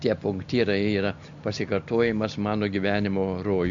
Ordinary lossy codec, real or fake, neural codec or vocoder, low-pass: MP3, 64 kbps; real; none; 7.2 kHz